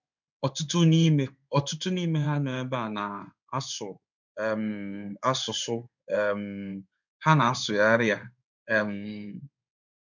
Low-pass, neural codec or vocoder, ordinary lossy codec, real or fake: 7.2 kHz; codec, 16 kHz in and 24 kHz out, 1 kbps, XY-Tokenizer; none; fake